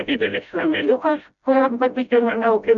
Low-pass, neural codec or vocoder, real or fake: 7.2 kHz; codec, 16 kHz, 0.5 kbps, FreqCodec, smaller model; fake